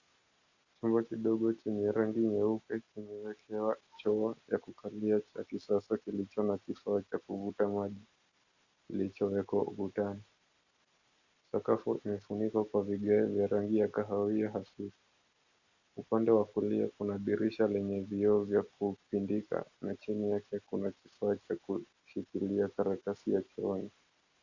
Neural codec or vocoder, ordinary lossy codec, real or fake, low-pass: none; Opus, 64 kbps; real; 7.2 kHz